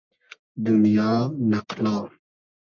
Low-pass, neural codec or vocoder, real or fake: 7.2 kHz; codec, 44.1 kHz, 1.7 kbps, Pupu-Codec; fake